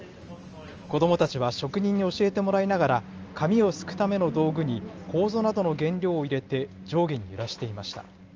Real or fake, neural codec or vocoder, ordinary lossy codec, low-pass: real; none; Opus, 24 kbps; 7.2 kHz